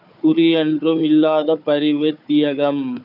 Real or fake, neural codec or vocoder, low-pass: fake; codec, 16 kHz, 4 kbps, FunCodec, trained on Chinese and English, 50 frames a second; 5.4 kHz